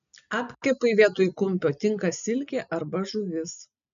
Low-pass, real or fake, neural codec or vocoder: 7.2 kHz; real; none